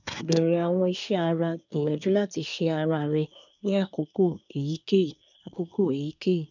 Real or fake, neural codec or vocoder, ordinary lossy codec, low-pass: fake; codec, 24 kHz, 1 kbps, SNAC; none; 7.2 kHz